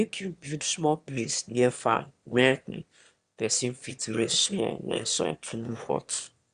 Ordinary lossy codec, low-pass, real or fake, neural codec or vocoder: Opus, 64 kbps; 9.9 kHz; fake; autoencoder, 22.05 kHz, a latent of 192 numbers a frame, VITS, trained on one speaker